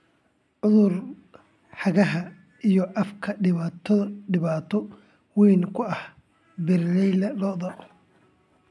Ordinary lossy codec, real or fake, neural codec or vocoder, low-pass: none; real; none; none